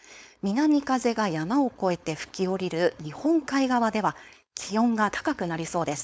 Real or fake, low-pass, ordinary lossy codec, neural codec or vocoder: fake; none; none; codec, 16 kHz, 4.8 kbps, FACodec